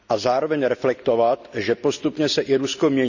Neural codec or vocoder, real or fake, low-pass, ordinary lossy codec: none; real; 7.2 kHz; none